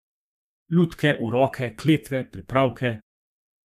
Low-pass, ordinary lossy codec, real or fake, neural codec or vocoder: 14.4 kHz; none; fake; codec, 32 kHz, 1.9 kbps, SNAC